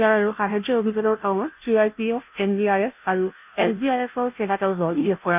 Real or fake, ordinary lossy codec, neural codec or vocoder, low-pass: fake; none; codec, 16 kHz, 0.5 kbps, FunCodec, trained on Chinese and English, 25 frames a second; 3.6 kHz